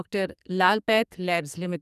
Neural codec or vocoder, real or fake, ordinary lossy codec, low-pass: codec, 44.1 kHz, 2.6 kbps, SNAC; fake; none; 14.4 kHz